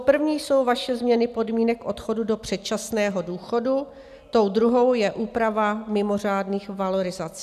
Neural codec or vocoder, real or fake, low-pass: none; real; 14.4 kHz